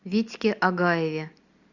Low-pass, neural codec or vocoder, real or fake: 7.2 kHz; none; real